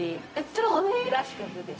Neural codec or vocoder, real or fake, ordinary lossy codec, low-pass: codec, 16 kHz, 0.4 kbps, LongCat-Audio-Codec; fake; none; none